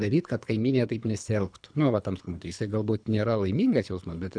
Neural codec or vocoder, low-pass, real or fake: codec, 24 kHz, 3 kbps, HILCodec; 9.9 kHz; fake